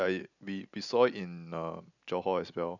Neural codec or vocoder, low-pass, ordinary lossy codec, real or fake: none; 7.2 kHz; none; real